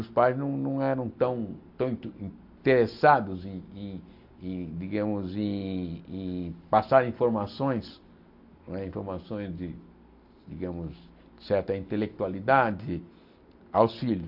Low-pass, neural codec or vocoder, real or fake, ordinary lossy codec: 5.4 kHz; none; real; AAC, 48 kbps